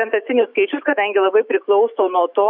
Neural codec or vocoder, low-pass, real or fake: autoencoder, 48 kHz, 128 numbers a frame, DAC-VAE, trained on Japanese speech; 5.4 kHz; fake